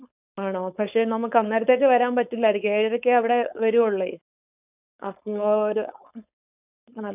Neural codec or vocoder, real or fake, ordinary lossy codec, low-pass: codec, 16 kHz, 4.8 kbps, FACodec; fake; none; 3.6 kHz